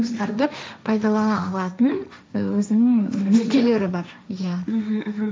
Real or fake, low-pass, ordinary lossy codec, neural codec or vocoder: fake; none; none; codec, 16 kHz, 1.1 kbps, Voila-Tokenizer